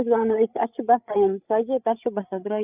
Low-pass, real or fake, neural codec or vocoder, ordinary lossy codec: 3.6 kHz; fake; codec, 16 kHz, 8 kbps, FreqCodec, larger model; none